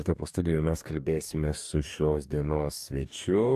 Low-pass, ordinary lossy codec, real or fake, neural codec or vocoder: 14.4 kHz; AAC, 96 kbps; fake; codec, 44.1 kHz, 2.6 kbps, DAC